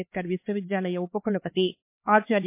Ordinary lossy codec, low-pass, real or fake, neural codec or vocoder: MP3, 24 kbps; 3.6 kHz; fake; codec, 16 kHz, 4 kbps, X-Codec, HuBERT features, trained on LibriSpeech